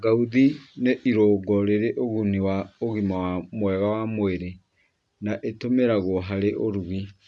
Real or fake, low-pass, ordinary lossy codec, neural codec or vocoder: real; none; none; none